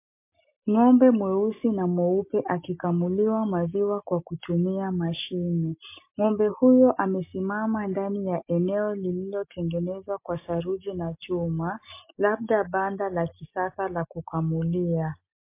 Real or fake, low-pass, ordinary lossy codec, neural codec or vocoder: real; 3.6 kHz; MP3, 24 kbps; none